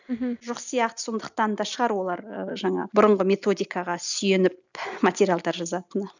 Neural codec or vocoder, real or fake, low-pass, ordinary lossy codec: none; real; 7.2 kHz; none